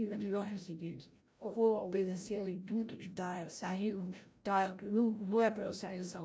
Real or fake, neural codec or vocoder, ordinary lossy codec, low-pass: fake; codec, 16 kHz, 0.5 kbps, FreqCodec, larger model; none; none